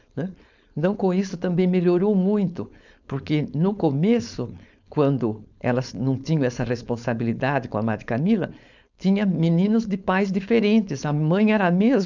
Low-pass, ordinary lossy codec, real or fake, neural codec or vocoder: 7.2 kHz; none; fake; codec, 16 kHz, 4.8 kbps, FACodec